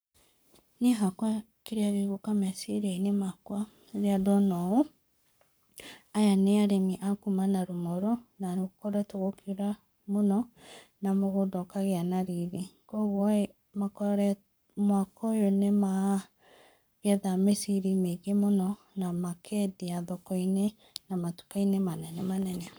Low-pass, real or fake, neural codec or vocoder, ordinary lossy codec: none; fake; codec, 44.1 kHz, 7.8 kbps, Pupu-Codec; none